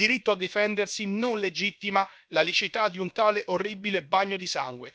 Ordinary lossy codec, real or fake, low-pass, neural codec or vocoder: none; fake; none; codec, 16 kHz, about 1 kbps, DyCAST, with the encoder's durations